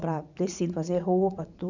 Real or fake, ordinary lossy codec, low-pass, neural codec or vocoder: fake; none; 7.2 kHz; vocoder, 44.1 kHz, 80 mel bands, Vocos